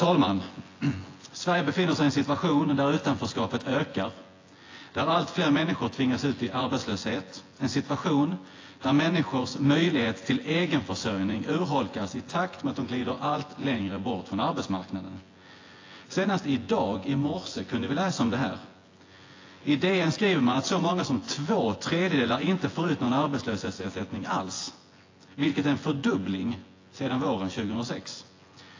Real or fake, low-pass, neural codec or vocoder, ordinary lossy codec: fake; 7.2 kHz; vocoder, 24 kHz, 100 mel bands, Vocos; AAC, 32 kbps